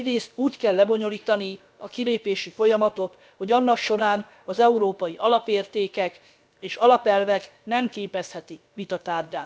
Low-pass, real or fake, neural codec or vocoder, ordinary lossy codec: none; fake; codec, 16 kHz, about 1 kbps, DyCAST, with the encoder's durations; none